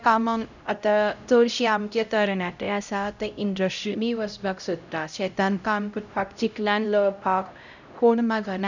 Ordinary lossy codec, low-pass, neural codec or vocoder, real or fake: none; 7.2 kHz; codec, 16 kHz, 0.5 kbps, X-Codec, HuBERT features, trained on LibriSpeech; fake